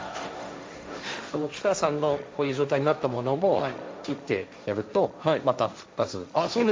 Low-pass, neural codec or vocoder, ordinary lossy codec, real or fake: none; codec, 16 kHz, 1.1 kbps, Voila-Tokenizer; none; fake